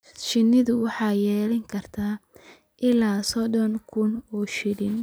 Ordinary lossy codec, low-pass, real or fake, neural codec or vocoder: none; none; real; none